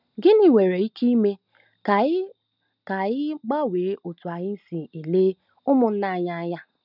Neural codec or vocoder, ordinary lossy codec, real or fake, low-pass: none; none; real; 5.4 kHz